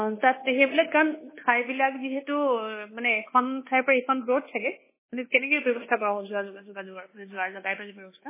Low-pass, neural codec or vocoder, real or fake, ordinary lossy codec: 3.6 kHz; codec, 24 kHz, 1.2 kbps, DualCodec; fake; MP3, 16 kbps